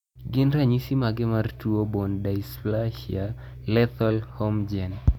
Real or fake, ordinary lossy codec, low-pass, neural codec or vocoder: real; none; 19.8 kHz; none